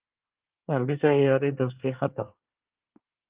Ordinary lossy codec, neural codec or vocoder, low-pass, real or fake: Opus, 32 kbps; codec, 24 kHz, 1 kbps, SNAC; 3.6 kHz; fake